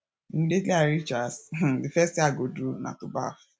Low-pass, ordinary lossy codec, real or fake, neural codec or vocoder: none; none; real; none